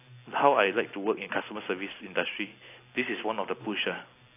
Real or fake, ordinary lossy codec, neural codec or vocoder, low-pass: real; AAC, 24 kbps; none; 3.6 kHz